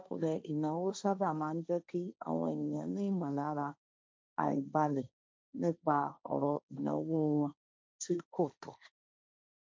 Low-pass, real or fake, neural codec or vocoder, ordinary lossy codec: none; fake; codec, 16 kHz, 1.1 kbps, Voila-Tokenizer; none